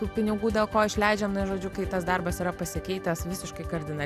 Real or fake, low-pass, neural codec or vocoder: real; 14.4 kHz; none